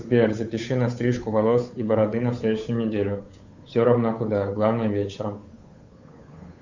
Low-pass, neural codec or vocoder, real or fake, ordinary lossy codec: 7.2 kHz; codec, 16 kHz, 8 kbps, FunCodec, trained on Chinese and English, 25 frames a second; fake; Opus, 64 kbps